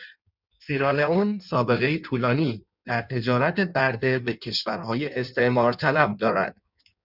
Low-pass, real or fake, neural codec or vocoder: 5.4 kHz; fake; codec, 16 kHz in and 24 kHz out, 1.1 kbps, FireRedTTS-2 codec